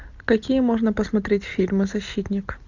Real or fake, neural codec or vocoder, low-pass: real; none; 7.2 kHz